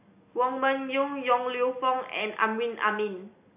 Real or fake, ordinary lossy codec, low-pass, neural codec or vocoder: real; AAC, 32 kbps; 3.6 kHz; none